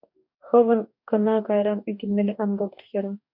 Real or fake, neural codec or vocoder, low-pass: fake; codec, 44.1 kHz, 2.6 kbps, DAC; 5.4 kHz